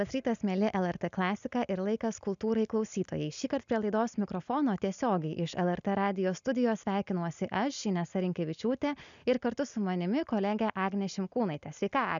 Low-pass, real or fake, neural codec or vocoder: 7.2 kHz; real; none